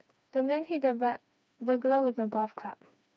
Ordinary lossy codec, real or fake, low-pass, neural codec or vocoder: none; fake; none; codec, 16 kHz, 2 kbps, FreqCodec, smaller model